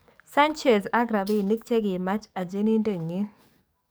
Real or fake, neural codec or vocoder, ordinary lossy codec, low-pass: fake; codec, 44.1 kHz, 7.8 kbps, DAC; none; none